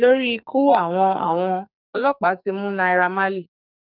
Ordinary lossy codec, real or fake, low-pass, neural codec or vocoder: none; fake; 5.4 kHz; codec, 44.1 kHz, 2.6 kbps, SNAC